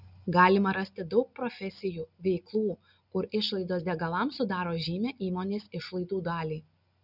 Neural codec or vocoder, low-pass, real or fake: none; 5.4 kHz; real